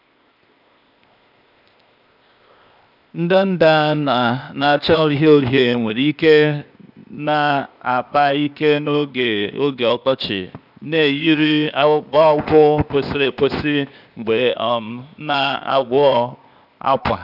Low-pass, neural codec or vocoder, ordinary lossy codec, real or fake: 5.4 kHz; codec, 16 kHz, 0.8 kbps, ZipCodec; none; fake